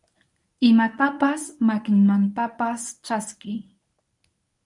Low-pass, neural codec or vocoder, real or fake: 10.8 kHz; codec, 24 kHz, 0.9 kbps, WavTokenizer, medium speech release version 1; fake